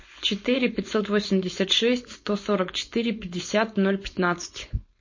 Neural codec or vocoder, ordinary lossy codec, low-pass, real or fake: codec, 16 kHz, 4.8 kbps, FACodec; MP3, 32 kbps; 7.2 kHz; fake